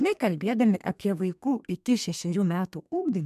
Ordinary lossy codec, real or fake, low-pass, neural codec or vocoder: MP3, 96 kbps; fake; 14.4 kHz; codec, 32 kHz, 1.9 kbps, SNAC